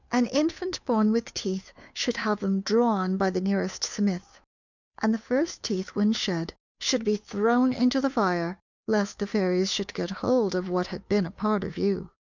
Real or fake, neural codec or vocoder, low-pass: fake; codec, 16 kHz, 2 kbps, FunCodec, trained on Chinese and English, 25 frames a second; 7.2 kHz